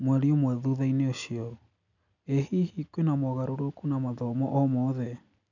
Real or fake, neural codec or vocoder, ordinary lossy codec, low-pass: real; none; none; 7.2 kHz